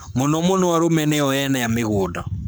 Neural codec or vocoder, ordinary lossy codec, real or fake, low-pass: codec, 44.1 kHz, 7.8 kbps, Pupu-Codec; none; fake; none